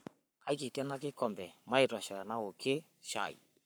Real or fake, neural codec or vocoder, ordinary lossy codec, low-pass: fake; codec, 44.1 kHz, 7.8 kbps, Pupu-Codec; none; none